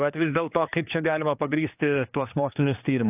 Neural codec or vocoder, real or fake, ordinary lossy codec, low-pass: codec, 16 kHz, 2 kbps, X-Codec, HuBERT features, trained on general audio; fake; AAC, 32 kbps; 3.6 kHz